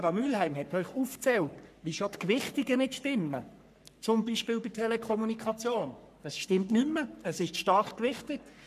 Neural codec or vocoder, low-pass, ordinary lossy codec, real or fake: codec, 44.1 kHz, 3.4 kbps, Pupu-Codec; 14.4 kHz; none; fake